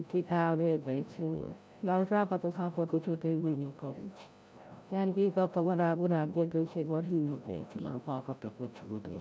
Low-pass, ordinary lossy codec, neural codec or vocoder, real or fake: none; none; codec, 16 kHz, 0.5 kbps, FreqCodec, larger model; fake